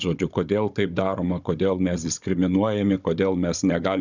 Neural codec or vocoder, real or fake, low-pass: codec, 16 kHz, 16 kbps, FunCodec, trained on Chinese and English, 50 frames a second; fake; 7.2 kHz